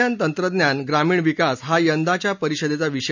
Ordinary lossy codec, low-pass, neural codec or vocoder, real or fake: none; 7.2 kHz; none; real